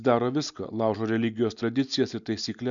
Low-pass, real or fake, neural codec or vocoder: 7.2 kHz; real; none